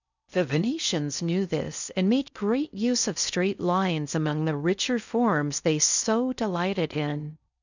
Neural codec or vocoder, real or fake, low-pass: codec, 16 kHz in and 24 kHz out, 0.6 kbps, FocalCodec, streaming, 4096 codes; fake; 7.2 kHz